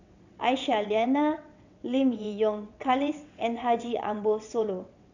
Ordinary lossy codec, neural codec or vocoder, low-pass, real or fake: none; vocoder, 22.05 kHz, 80 mel bands, WaveNeXt; 7.2 kHz; fake